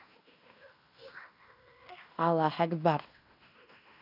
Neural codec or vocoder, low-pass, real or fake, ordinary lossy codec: codec, 16 kHz in and 24 kHz out, 0.9 kbps, LongCat-Audio-Codec, fine tuned four codebook decoder; 5.4 kHz; fake; none